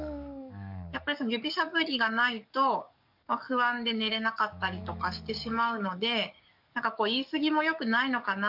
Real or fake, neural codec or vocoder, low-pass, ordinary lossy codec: fake; codec, 44.1 kHz, 7.8 kbps, DAC; 5.4 kHz; none